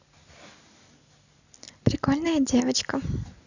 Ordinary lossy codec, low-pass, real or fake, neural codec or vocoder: none; 7.2 kHz; real; none